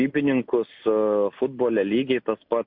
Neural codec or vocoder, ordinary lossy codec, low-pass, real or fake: none; MP3, 48 kbps; 5.4 kHz; real